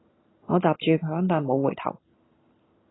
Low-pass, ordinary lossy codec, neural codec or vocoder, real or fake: 7.2 kHz; AAC, 16 kbps; none; real